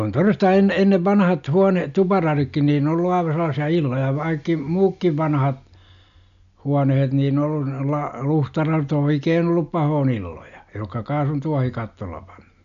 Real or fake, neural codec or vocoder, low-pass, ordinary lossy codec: real; none; 7.2 kHz; MP3, 96 kbps